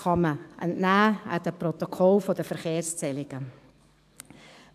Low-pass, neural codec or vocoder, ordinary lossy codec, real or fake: 14.4 kHz; codec, 44.1 kHz, 7.8 kbps, DAC; none; fake